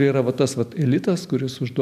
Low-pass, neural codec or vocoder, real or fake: 14.4 kHz; none; real